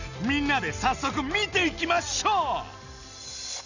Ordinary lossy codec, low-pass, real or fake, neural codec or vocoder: none; 7.2 kHz; real; none